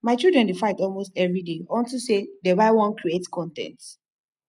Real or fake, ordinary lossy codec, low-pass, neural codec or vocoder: real; none; 10.8 kHz; none